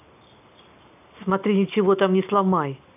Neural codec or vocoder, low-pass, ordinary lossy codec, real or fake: none; 3.6 kHz; none; real